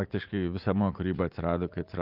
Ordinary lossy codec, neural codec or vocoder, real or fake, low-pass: Opus, 24 kbps; vocoder, 44.1 kHz, 80 mel bands, Vocos; fake; 5.4 kHz